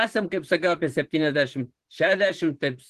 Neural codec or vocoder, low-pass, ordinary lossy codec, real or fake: vocoder, 44.1 kHz, 128 mel bands, Pupu-Vocoder; 14.4 kHz; Opus, 16 kbps; fake